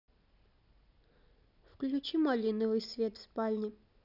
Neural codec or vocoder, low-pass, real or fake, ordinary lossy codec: none; 5.4 kHz; real; none